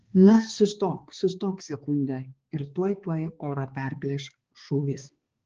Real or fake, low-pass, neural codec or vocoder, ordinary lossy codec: fake; 7.2 kHz; codec, 16 kHz, 2 kbps, X-Codec, HuBERT features, trained on balanced general audio; Opus, 16 kbps